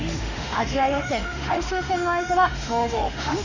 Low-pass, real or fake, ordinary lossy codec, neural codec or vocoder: 7.2 kHz; fake; none; autoencoder, 48 kHz, 32 numbers a frame, DAC-VAE, trained on Japanese speech